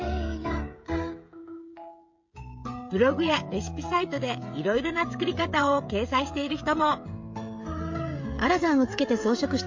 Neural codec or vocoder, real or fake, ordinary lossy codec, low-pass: codec, 16 kHz, 16 kbps, FreqCodec, smaller model; fake; MP3, 48 kbps; 7.2 kHz